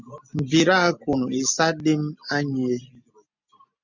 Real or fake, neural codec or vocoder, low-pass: real; none; 7.2 kHz